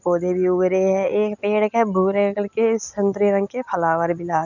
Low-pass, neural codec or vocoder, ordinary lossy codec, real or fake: 7.2 kHz; none; none; real